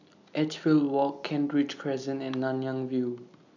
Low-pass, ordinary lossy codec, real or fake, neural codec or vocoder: 7.2 kHz; none; real; none